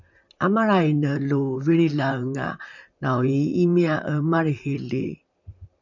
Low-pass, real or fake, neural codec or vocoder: 7.2 kHz; fake; vocoder, 44.1 kHz, 128 mel bands, Pupu-Vocoder